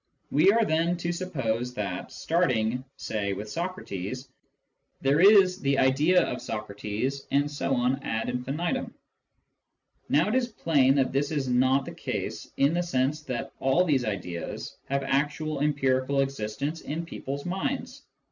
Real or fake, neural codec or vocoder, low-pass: real; none; 7.2 kHz